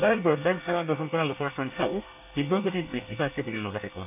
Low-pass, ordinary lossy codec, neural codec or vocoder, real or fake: 3.6 kHz; none; codec, 24 kHz, 1 kbps, SNAC; fake